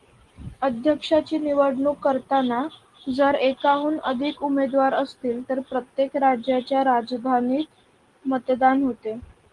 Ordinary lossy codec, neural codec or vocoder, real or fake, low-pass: Opus, 24 kbps; none; real; 10.8 kHz